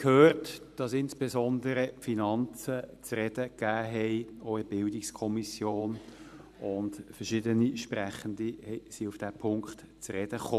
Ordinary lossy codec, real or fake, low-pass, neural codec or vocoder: none; fake; 14.4 kHz; vocoder, 44.1 kHz, 128 mel bands every 512 samples, BigVGAN v2